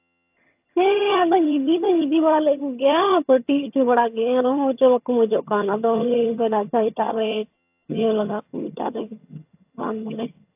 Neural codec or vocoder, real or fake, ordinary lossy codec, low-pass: vocoder, 22.05 kHz, 80 mel bands, HiFi-GAN; fake; AAC, 32 kbps; 3.6 kHz